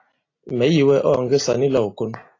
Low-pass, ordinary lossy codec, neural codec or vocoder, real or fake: 7.2 kHz; AAC, 32 kbps; none; real